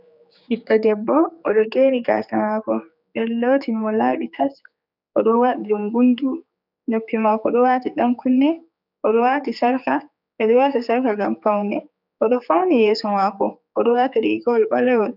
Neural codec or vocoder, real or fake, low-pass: codec, 16 kHz, 4 kbps, X-Codec, HuBERT features, trained on general audio; fake; 5.4 kHz